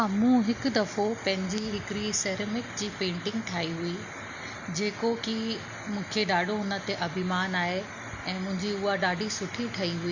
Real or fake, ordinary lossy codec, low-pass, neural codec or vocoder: real; Opus, 64 kbps; 7.2 kHz; none